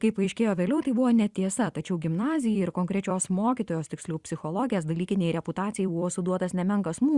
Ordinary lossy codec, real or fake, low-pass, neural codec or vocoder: Opus, 64 kbps; fake; 10.8 kHz; vocoder, 44.1 kHz, 128 mel bands every 256 samples, BigVGAN v2